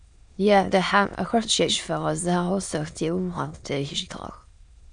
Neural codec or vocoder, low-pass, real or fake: autoencoder, 22.05 kHz, a latent of 192 numbers a frame, VITS, trained on many speakers; 9.9 kHz; fake